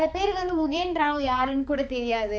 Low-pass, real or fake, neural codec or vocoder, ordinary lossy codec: none; fake; codec, 16 kHz, 4 kbps, X-Codec, HuBERT features, trained on balanced general audio; none